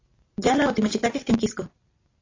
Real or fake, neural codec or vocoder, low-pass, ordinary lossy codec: real; none; 7.2 kHz; AAC, 32 kbps